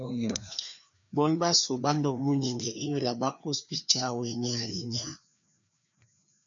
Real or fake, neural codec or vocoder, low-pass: fake; codec, 16 kHz, 2 kbps, FreqCodec, larger model; 7.2 kHz